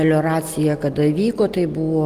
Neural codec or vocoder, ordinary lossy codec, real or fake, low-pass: none; Opus, 24 kbps; real; 14.4 kHz